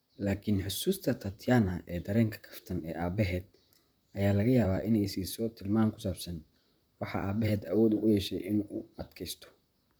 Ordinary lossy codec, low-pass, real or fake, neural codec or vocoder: none; none; fake; vocoder, 44.1 kHz, 128 mel bands, Pupu-Vocoder